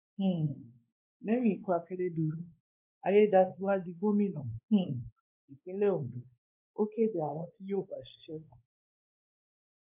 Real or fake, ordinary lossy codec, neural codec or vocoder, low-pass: fake; none; codec, 16 kHz, 2 kbps, X-Codec, WavLM features, trained on Multilingual LibriSpeech; 3.6 kHz